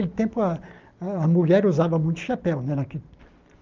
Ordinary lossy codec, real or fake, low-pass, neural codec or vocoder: Opus, 32 kbps; real; 7.2 kHz; none